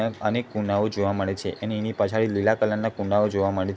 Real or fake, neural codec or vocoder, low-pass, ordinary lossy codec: real; none; none; none